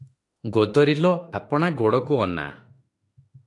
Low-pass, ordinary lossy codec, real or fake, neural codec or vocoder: 10.8 kHz; AAC, 48 kbps; fake; autoencoder, 48 kHz, 32 numbers a frame, DAC-VAE, trained on Japanese speech